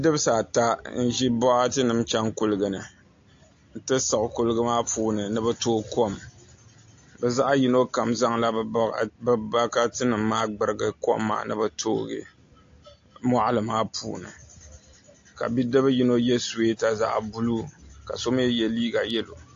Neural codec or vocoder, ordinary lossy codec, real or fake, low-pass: none; AAC, 48 kbps; real; 7.2 kHz